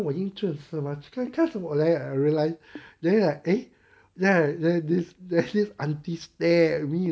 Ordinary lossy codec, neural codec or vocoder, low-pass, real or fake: none; none; none; real